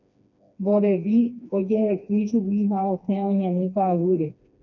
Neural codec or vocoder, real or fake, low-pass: codec, 16 kHz, 2 kbps, FreqCodec, smaller model; fake; 7.2 kHz